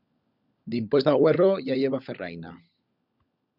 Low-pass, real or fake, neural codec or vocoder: 5.4 kHz; fake; codec, 16 kHz, 16 kbps, FunCodec, trained on LibriTTS, 50 frames a second